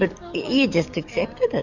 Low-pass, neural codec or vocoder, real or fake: 7.2 kHz; codec, 44.1 kHz, 7.8 kbps, DAC; fake